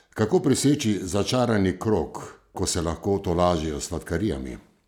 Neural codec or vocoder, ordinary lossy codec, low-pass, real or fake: none; none; 19.8 kHz; real